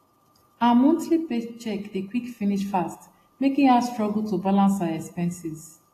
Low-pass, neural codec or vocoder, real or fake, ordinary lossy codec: 14.4 kHz; none; real; AAC, 48 kbps